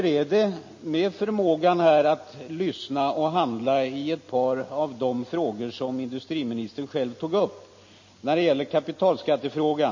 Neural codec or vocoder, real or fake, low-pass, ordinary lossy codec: none; real; 7.2 kHz; MP3, 32 kbps